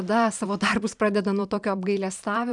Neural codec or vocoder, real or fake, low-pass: vocoder, 44.1 kHz, 128 mel bands every 512 samples, BigVGAN v2; fake; 10.8 kHz